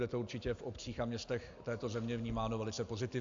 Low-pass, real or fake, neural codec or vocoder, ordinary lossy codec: 7.2 kHz; real; none; AAC, 64 kbps